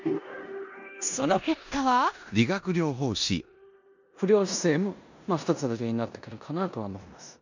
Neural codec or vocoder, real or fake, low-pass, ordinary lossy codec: codec, 16 kHz in and 24 kHz out, 0.9 kbps, LongCat-Audio-Codec, four codebook decoder; fake; 7.2 kHz; none